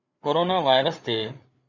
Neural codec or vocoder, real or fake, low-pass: codec, 16 kHz, 16 kbps, FreqCodec, larger model; fake; 7.2 kHz